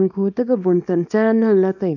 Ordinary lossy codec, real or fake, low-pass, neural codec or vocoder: none; fake; 7.2 kHz; codec, 24 kHz, 0.9 kbps, WavTokenizer, small release